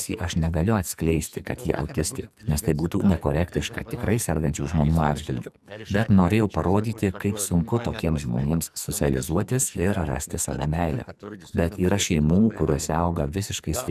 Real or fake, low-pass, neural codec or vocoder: fake; 14.4 kHz; codec, 44.1 kHz, 2.6 kbps, SNAC